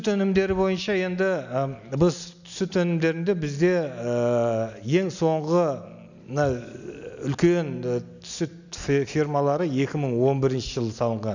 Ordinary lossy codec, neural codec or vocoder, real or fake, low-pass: none; none; real; 7.2 kHz